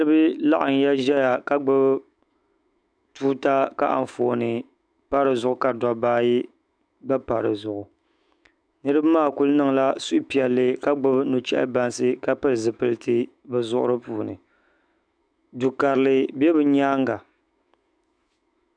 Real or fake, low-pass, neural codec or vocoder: fake; 9.9 kHz; autoencoder, 48 kHz, 128 numbers a frame, DAC-VAE, trained on Japanese speech